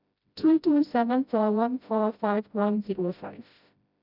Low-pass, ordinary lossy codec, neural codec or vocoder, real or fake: 5.4 kHz; none; codec, 16 kHz, 0.5 kbps, FreqCodec, smaller model; fake